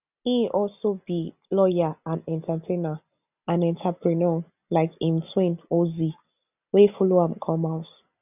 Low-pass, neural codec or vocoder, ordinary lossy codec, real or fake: 3.6 kHz; none; none; real